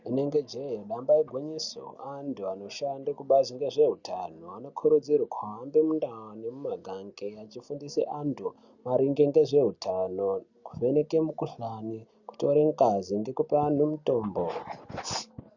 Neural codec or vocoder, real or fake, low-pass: none; real; 7.2 kHz